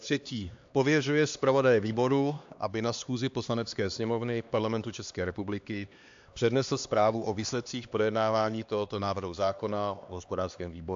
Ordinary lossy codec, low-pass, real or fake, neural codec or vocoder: AAC, 64 kbps; 7.2 kHz; fake; codec, 16 kHz, 2 kbps, X-Codec, HuBERT features, trained on LibriSpeech